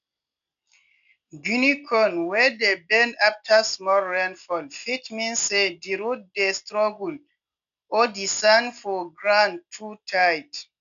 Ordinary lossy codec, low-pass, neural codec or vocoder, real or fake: none; 7.2 kHz; none; real